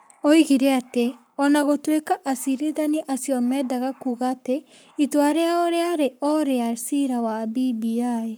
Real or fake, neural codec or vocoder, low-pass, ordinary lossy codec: fake; codec, 44.1 kHz, 7.8 kbps, Pupu-Codec; none; none